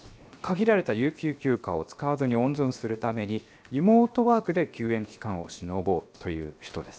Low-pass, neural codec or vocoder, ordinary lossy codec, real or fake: none; codec, 16 kHz, 0.7 kbps, FocalCodec; none; fake